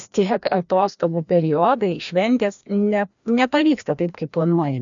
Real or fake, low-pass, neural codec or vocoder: fake; 7.2 kHz; codec, 16 kHz, 1 kbps, FreqCodec, larger model